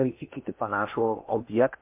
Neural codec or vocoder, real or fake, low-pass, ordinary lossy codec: codec, 16 kHz in and 24 kHz out, 0.6 kbps, FocalCodec, streaming, 4096 codes; fake; 3.6 kHz; MP3, 32 kbps